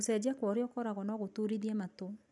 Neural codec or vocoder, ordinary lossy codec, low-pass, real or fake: none; none; 10.8 kHz; real